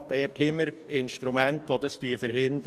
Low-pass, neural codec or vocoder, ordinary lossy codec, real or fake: 14.4 kHz; codec, 44.1 kHz, 2.6 kbps, DAC; none; fake